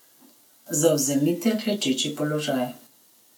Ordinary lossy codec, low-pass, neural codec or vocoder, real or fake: none; none; none; real